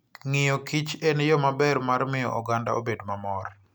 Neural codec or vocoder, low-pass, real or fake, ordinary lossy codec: none; none; real; none